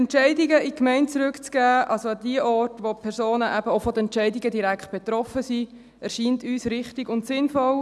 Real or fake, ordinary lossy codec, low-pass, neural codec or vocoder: real; none; none; none